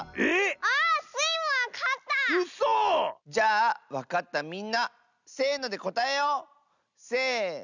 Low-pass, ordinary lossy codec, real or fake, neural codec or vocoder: 7.2 kHz; none; real; none